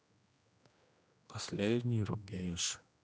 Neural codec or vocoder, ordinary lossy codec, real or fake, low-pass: codec, 16 kHz, 1 kbps, X-Codec, HuBERT features, trained on general audio; none; fake; none